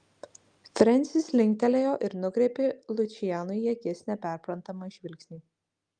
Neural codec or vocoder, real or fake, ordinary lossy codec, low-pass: none; real; Opus, 32 kbps; 9.9 kHz